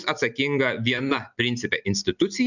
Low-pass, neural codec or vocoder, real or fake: 7.2 kHz; none; real